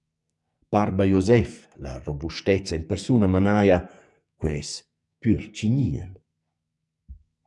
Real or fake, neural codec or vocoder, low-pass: fake; codec, 44.1 kHz, 2.6 kbps, SNAC; 10.8 kHz